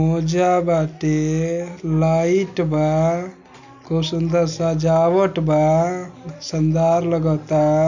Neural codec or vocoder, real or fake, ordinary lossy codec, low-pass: none; real; none; 7.2 kHz